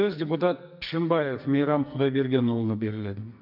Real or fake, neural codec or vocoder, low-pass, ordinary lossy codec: fake; codec, 44.1 kHz, 2.6 kbps, SNAC; 5.4 kHz; none